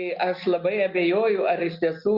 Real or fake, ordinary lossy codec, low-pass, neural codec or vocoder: real; Opus, 64 kbps; 5.4 kHz; none